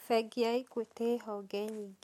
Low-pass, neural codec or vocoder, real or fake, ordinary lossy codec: 19.8 kHz; none; real; MP3, 64 kbps